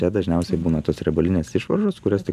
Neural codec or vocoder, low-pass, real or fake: none; 14.4 kHz; real